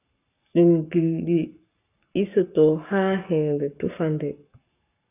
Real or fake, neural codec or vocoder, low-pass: fake; codec, 44.1 kHz, 7.8 kbps, Pupu-Codec; 3.6 kHz